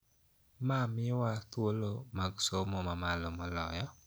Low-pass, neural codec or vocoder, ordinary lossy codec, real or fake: none; none; none; real